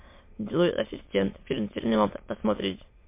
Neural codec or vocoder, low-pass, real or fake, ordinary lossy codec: autoencoder, 22.05 kHz, a latent of 192 numbers a frame, VITS, trained on many speakers; 3.6 kHz; fake; MP3, 24 kbps